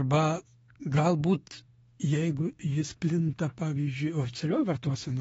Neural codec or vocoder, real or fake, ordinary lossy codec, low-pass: autoencoder, 48 kHz, 32 numbers a frame, DAC-VAE, trained on Japanese speech; fake; AAC, 24 kbps; 19.8 kHz